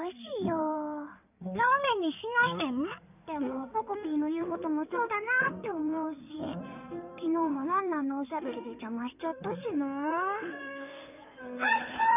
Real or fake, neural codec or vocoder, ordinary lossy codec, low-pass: fake; codec, 16 kHz in and 24 kHz out, 2.2 kbps, FireRedTTS-2 codec; none; 3.6 kHz